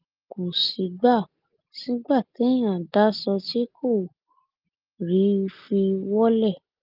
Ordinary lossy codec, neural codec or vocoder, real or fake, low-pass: Opus, 32 kbps; none; real; 5.4 kHz